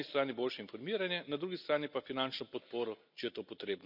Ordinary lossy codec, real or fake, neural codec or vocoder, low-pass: none; real; none; 5.4 kHz